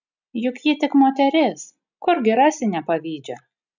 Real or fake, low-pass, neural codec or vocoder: real; 7.2 kHz; none